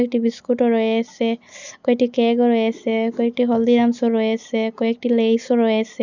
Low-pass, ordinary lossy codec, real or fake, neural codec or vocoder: 7.2 kHz; none; real; none